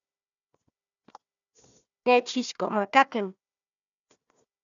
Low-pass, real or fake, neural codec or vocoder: 7.2 kHz; fake; codec, 16 kHz, 1 kbps, FunCodec, trained on Chinese and English, 50 frames a second